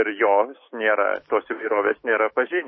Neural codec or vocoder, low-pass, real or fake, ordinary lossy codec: autoencoder, 48 kHz, 128 numbers a frame, DAC-VAE, trained on Japanese speech; 7.2 kHz; fake; MP3, 24 kbps